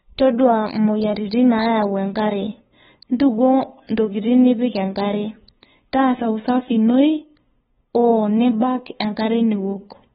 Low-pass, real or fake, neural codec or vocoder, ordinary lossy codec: 7.2 kHz; fake; codec, 16 kHz, 2 kbps, FunCodec, trained on LibriTTS, 25 frames a second; AAC, 16 kbps